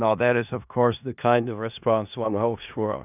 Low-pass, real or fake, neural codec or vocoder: 3.6 kHz; fake; codec, 16 kHz in and 24 kHz out, 0.4 kbps, LongCat-Audio-Codec, four codebook decoder